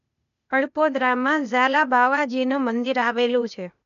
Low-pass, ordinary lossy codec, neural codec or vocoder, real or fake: 7.2 kHz; none; codec, 16 kHz, 0.8 kbps, ZipCodec; fake